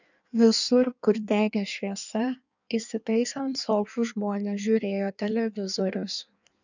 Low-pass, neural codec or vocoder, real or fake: 7.2 kHz; codec, 16 kHz in and 24 kHz out, 1.1 kbps, FireRedTTS-2 codec; fake